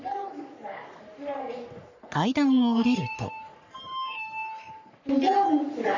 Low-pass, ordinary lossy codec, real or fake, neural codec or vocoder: 7.2 kHz; none; fake; codec, 44.1 kHz, 3.4 kbps, Pupu-Codec